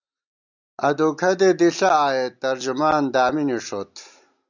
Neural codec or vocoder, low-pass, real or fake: none; 7.2 kHz; real